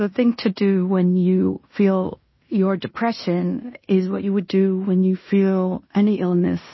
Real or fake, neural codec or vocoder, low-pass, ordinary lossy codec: fake; codec, 16 kHz in and 24 kHz out, 0.9 kbps, LongCat-Audio-Codec, fine tuned four codebook decoder; 7.2 kHz; MP3, 24 kbps